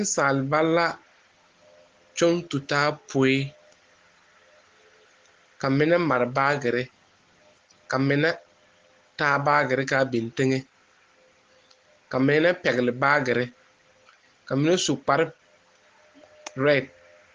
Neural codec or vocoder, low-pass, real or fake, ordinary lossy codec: none; 7.2 kHz; real; Opus, 16 kbps